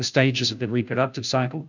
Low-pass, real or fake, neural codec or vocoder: 7.2 kHz; fake; codec, 16 kHz, 0.5 kbps, FunCodec, trained on Chinese and English, 25 frames a second